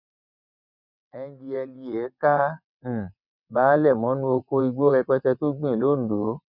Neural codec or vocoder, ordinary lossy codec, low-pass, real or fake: vocoder, 22.05 kHz, 80 mel bands, WaveNeXt; none; 5.4 kHz; fake